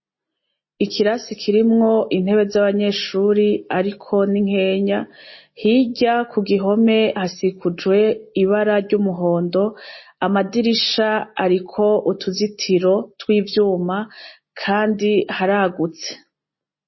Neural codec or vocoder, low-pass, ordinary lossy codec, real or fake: none; 7.2 kHz; MP3, 24 kbps; real